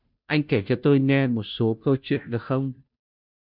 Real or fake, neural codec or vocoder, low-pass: fake; codec, 16 kHz, 0.5 kbps, FunCodec, trained on Chinese and English, 25 frames a second; 5.4 kHz